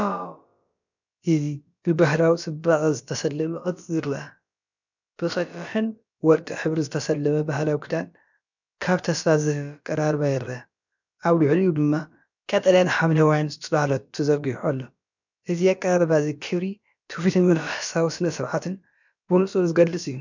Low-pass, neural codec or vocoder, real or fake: 7.2 kHz; codec, 16 kHz, about 1 kbps, DyCAST, with the encoder's durations; fake